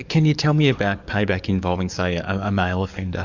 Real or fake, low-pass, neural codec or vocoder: fake; 7.2 kHz; codec, 44.1 kHz, 7.8 kbps, DAC